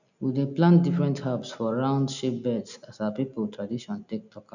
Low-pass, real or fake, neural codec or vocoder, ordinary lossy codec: 7.2 kHz; real; none; none